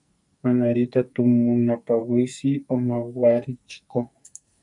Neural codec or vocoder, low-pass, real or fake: codec, 44.1 kHz, 2.6 kbps, SNAC; 10.8 kHz; fake